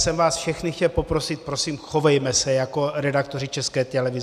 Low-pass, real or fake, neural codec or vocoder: 14.4 kHz; real; none